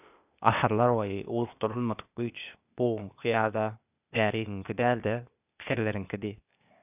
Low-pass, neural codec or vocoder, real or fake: 3.6 kHz; codec, 16 kHz, 0.8 kbps, ZipCodec; fake